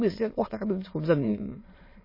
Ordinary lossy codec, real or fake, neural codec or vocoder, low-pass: MP3, 24 kbps; fake; autoencoder, 22.05 kHz, a latent of 192 numbers a frame, VITS, trained on many speakers; 5.4 kHz